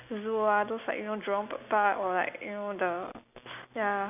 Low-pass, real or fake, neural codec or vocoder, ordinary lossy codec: 3.6 kHz; real; none; none